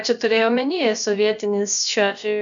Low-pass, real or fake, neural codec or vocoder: 7.2 kHz; fake; codec, 16 kHz, about 1 kbps, DyCAST, with the encoder's durations